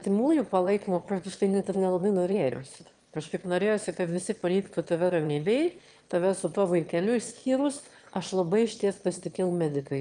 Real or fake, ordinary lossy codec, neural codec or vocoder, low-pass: fake; Opus, 32 kbps; autoencoder, 22.05 kHz, a latent of 192 numbers a frame, VITS, trained on one speaker; 9.9 kHz